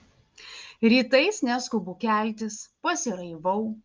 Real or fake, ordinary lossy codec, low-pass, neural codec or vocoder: real; Opus, 24 kbps; 7.2 kHz; none